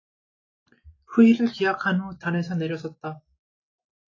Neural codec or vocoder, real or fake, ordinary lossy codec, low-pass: none; real; AAC, 32 kbps; 7.2 kHz